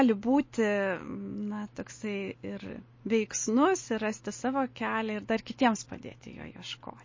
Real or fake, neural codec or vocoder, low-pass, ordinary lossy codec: real; none; 7.2 kHz; MP3, 32 kbps